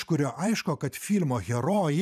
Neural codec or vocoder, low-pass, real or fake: vocoder, 44.1 kHz, 128 mel bands every 512 samples, BigVGAN v2; 14.4 kHz; fake